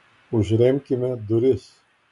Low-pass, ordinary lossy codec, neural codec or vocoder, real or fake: 10.8 kHz; MP3, 96 kbps; none; real